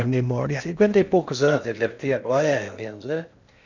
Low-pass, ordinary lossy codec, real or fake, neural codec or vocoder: 7.2 kHz; none; fake; codec, 16 kHz in and 24 kHz out, 0.6 kbps, FocalCodec, streaming, 4096 codes